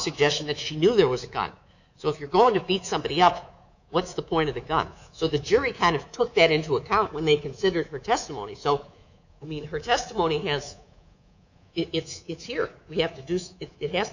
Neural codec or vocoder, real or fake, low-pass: codec, 24 kHz, 3.1 kbps, DualCodec; fake; 7.2 kHz